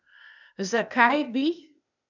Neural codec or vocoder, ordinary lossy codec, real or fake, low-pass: codec, 16 kHz, 0.8 kbps, ZipCodec; none; fake; 7.2 kHz